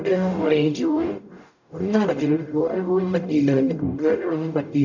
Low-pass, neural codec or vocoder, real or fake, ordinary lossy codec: 7.2 kHz; codec, 44.1 kHz, 0.9 kbps, DAC; fake; none